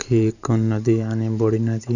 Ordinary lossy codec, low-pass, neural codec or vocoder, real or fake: none; 7.2 kHz; vocoder, 44.1 kHz, 128 mel bands every 512 samples, BigVGAN v2; fake